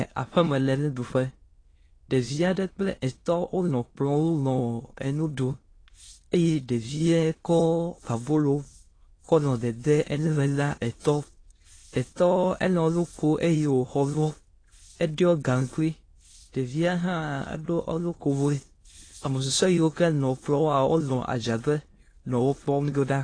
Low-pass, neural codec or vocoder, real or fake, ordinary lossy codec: 9.9 kHz; autoencoder, 22.05 kHz, a latent of 192 numbers a frame, VITS, trained on many speakers; fake; AAC, 32 kbps